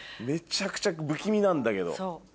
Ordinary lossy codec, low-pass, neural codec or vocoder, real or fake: none; none; none; real